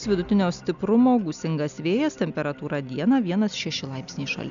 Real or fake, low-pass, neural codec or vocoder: real; 7.2 kHz; none